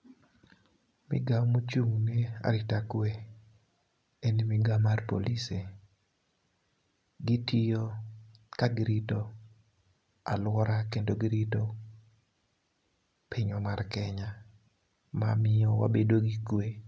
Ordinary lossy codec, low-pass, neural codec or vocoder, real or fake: none; none; none; real